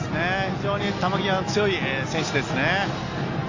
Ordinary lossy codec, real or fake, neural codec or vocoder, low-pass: AAC, 48 kbps; real; none; 7.2 kHz